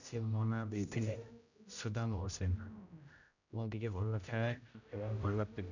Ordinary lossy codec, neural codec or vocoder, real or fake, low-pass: none; codec, 16 kHz, 0.5 kbps, X-Codec, HuBERT features, trained on general audio; fake; 7.2 kHz